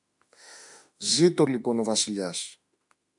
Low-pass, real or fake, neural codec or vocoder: 10.8 kHz; fake; autoencoder, 48 kHz, 32 numbers a frame, DAC-VAE, trained on Japanese speech